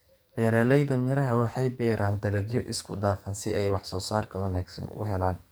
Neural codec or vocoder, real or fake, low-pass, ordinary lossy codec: codec, 44.1 kHz, 2.6 kbps, SNAC; fake; none; none